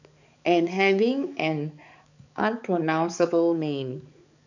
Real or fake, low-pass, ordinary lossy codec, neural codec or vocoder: fake; 7.2 kHz; none; codec, 16 kHz, 4 kbps, X-Codec, HuBERT features, trained on balanced general audio